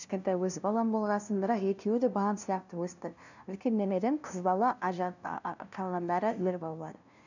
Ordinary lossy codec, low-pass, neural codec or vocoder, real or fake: none; 7.2 kHz; codec, 16 kHz, 0.5 kbps, FunCodec, trained on LibriTTS, 25 frames a second; fake